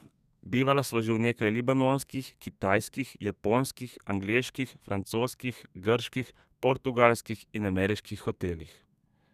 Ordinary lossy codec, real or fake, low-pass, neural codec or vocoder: none; fake; 14.4 kHz; codec, 32 kHz, 1.9 kbps, SNAC